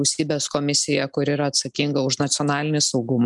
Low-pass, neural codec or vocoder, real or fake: 10.8 kHz; none; real